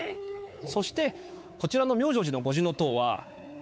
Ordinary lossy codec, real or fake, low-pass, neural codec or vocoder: none; fake; none; codec, 16 kHz, 4 kbps, X-Codec, WavLM features, trained on Multilingual LibriSpeech